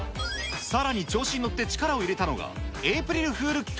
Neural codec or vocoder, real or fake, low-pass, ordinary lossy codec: none; real; none; none